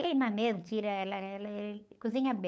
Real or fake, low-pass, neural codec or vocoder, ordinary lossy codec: fake; none; codec, 16 kHz, 8 kbps, FunCodec, trained on LibriTTS, 25 frames a second; none